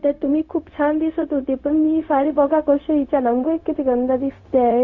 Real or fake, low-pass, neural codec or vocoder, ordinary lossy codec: fake; 7.2 kHz; codec, 16 kHz, 0.4 kbps, LongCat-Audio-Codec; none